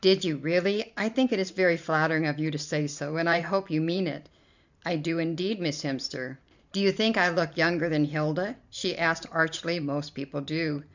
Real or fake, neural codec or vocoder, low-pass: fake; vocoder, 44.1 kHz, 128 mel bands every 512 samples, BigVGAN v2; 7.2 kHz